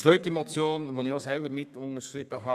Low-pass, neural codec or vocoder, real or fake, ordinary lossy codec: 14.4 kHz; codec, 32 kHz, 1.9 kbps, SNAC; fake; none